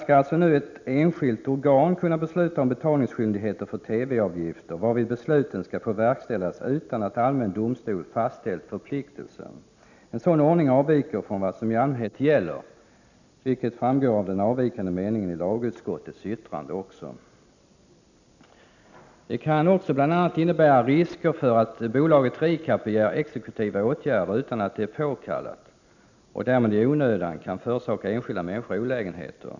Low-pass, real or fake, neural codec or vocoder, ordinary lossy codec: 7.2 kHz; real; none; none